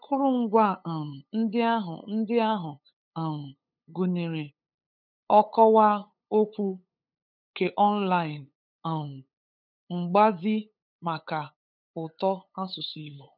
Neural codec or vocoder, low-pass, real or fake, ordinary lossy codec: codec, 16 kHz, 8 kbps, FunCodec, trained on LibriTTS, 25 frames a second; 5.4 kHz; fake; none